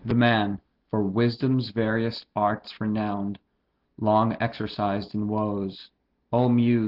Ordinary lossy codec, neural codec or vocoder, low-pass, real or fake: Opus, 16 kbps; none; 5.4 kHz; real